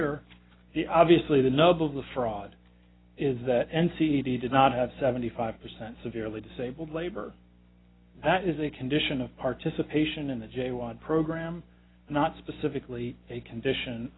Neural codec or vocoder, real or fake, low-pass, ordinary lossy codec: none; real; 7.2 kHz; AAC, 16 kbps